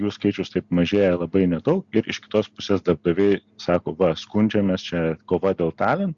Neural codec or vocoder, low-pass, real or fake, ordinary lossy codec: none; 7.2 kHz; real; Opus, 64 kbps